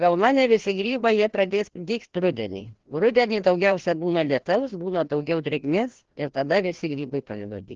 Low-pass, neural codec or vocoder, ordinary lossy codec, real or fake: 7.2 kHz; codec, 16 kHz, 1 kbps, FreqCodec, larger model; Opus, 16 kbps; fake